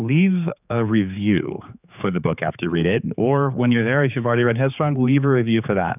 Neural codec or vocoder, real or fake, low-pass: codec, 16 kHz, 4 kbps, X-Codec, HuBERT features, trained on general audio; fake; 3.6 kHz